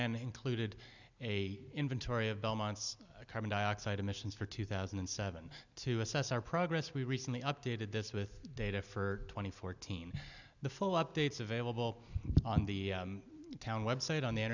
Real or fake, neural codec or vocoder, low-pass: real; none; 7.2 kHz